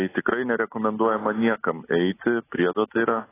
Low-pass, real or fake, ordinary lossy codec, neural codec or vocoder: 3.6 kHz; real; AAC, 16 kbps; none